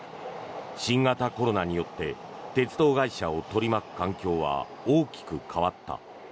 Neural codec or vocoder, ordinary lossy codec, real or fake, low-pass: none; none; real; none